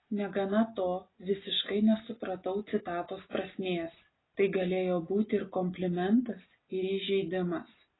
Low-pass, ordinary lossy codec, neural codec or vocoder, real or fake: 7.2 kHz; AAC, 16 kbps; none; real